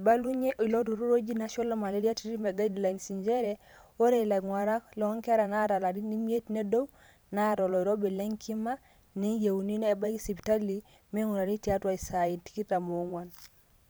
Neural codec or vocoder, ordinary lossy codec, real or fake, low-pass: vocoder, 44.1 kHz, 128 mel bands every 512 samples, BigVGAN v2; none; fake; none